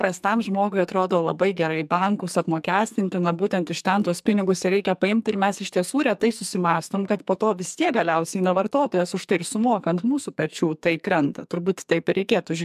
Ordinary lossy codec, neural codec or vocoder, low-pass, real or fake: MP3, 96 kbps; codec, 44.1 kHz, 2.6 kbps, SNAC; 14.4 kHz; fake